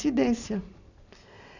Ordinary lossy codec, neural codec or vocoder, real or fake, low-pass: none; none; real; 7.2 kHz